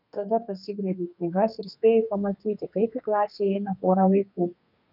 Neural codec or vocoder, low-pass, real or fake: codec, 32 kHz, 1.9 kbps, SNAC; 5.4 kHz; fake